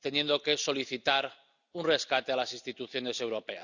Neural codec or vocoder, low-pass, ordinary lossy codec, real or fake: none; 7.2 kHz; none; real